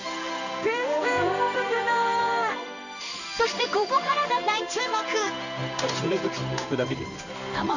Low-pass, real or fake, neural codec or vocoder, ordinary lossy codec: 7.2 kHz; fake; codec, 16 kHz in and 24 kHz out, 1 kbps, XY-Tokenizer; none